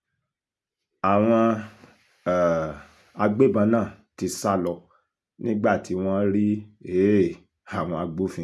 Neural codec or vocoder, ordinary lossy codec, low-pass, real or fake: none; none; none; real